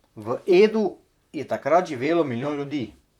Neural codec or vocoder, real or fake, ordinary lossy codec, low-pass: vocoder, 44.1 kHz, 128 mel bands, Pupu-Vocoder; fake; none; 19.8 kHz